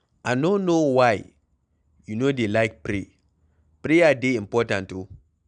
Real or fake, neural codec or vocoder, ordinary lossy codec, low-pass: real; none; none; 9.9 kHz